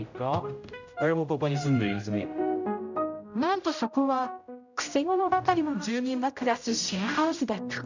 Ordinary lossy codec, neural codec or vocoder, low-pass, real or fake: none; codec, 16 kHz, 0.5 kbps, X-Codec, HuBERT features, trained on general audio; 7.2 kHz; fake